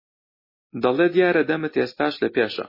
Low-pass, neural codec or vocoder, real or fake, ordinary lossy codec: 5.4 kHz; none; real; MP3, 24 kbps